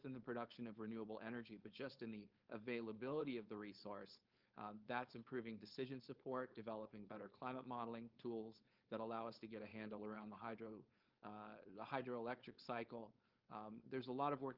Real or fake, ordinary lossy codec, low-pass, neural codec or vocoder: fake; Opus, 24 kbps; 5.4 kHz; codec, 16 kHz, 4.8 kbps, FACodec